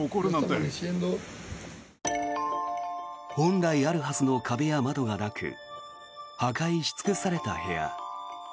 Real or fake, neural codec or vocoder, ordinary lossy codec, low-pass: real; none; none; none